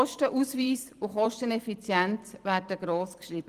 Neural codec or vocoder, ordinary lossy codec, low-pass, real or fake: vocoder, 44.1 kHz, 128 mel bands every 256 samples, BigVGAN v2; Opus, 24 kbps; 14.4 kHz; fake